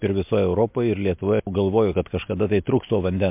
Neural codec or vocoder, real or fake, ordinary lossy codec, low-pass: none; real; MP3, 32 kbps; 3.6 kHz